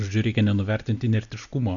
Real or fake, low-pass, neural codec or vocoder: real; 7.2 kHz; none